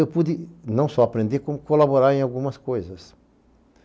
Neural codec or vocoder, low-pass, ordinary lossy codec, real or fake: none; none; none; real